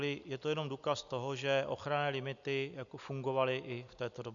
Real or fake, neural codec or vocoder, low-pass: real; none; 7.2 kHz